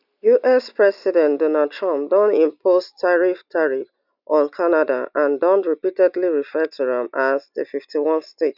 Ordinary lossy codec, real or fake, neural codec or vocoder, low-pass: none; real; none; 5.4 kHz